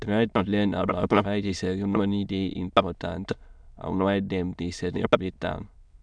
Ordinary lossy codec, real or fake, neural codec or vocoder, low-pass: none; fake; autoencoder, 22.05 kHz, a latent of 192 numbers a frame, VITS, trained on many speakers; 9.9 kHz